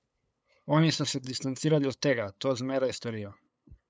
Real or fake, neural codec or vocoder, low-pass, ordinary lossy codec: fake; codec, 16 kHz, 8 kbps, FunCodec, trained on LibriTTS, 25 frames a second; none; none